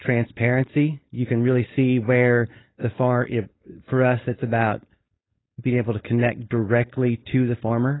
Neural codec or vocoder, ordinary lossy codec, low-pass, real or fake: codec, 16 kHz, 4.8 kbps, FACodec; AAC, 16 kbps; 7.2 kHz; fake